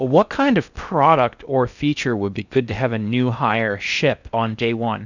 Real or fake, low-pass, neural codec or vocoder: fake; 7.2 kHz; codec, 16 kHz in and 24 kHz out, 0.6 kbps, FocalCodec, streaming, 4096 codes